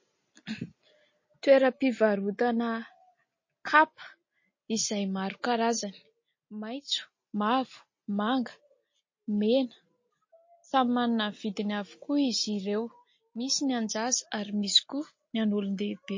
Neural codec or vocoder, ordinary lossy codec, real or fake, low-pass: none; MP3, 32 kbps; real; 7.2 kHz